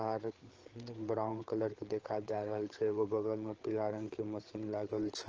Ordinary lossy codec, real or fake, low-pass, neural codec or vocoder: Opus, 16 kbps; fake; 7.2 kHz; codec, 16 kHz, 8 kbps, FreqCodec, larger model